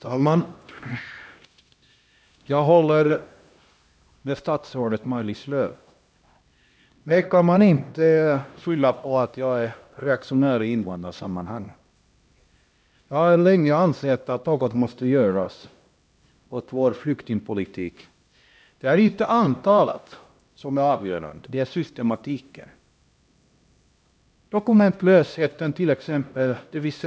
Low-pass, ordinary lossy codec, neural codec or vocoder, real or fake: none; none; codec, 16 kHz, 1 kbps, X-Codec, HuBERT features, trained on LibriSpeech; fake